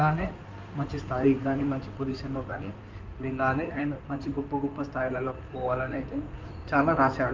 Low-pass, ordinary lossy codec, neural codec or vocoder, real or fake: 7.2 kHz; Opus, 24 kbps; codec, 16 kHz in and 24 kHz out, 2.2 kbps, FireRedTTS-2 codec; fake